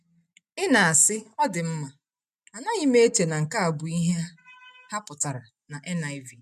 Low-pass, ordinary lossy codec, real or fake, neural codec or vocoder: 14.4 kHz; none; real; none